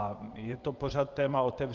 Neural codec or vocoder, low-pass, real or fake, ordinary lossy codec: vocoder, 24 kHz, 100 mel bands, Vocos; 7.2 kHz; fake; Opus, 24 kbps